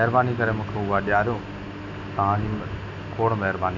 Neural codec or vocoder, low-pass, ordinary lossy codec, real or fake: none; 7.2 kHz; MP3, 48 kbps; real